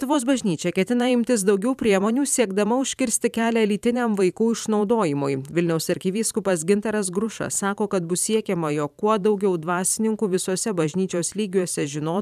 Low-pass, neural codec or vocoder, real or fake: 14.4 kHz; vocoder, 44.1 kHz, 128 mel bands every 512 samples, BigVGAN v2; fake